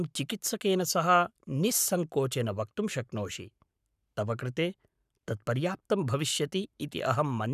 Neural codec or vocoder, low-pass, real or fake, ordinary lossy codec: codec, 44.1 kHz, 7.8 kbps, Pupu-Codec; 14.4 kHz; fake; none